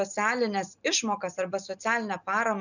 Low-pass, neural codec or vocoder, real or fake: 7.2 kHz; none; real